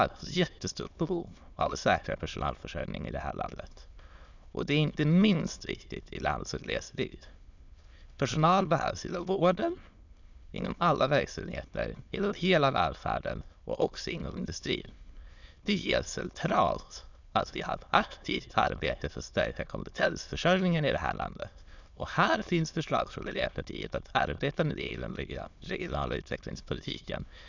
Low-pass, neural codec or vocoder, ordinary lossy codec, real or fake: 7.2 kHz; autoencoder, 22.05 kHz, a latent of 192 numbers a frame, VITS, trained on many speakers; none; fake